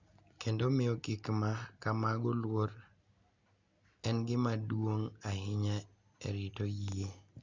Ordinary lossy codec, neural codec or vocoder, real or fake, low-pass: Opus, 64 kbps; none; real; 7.2 kHz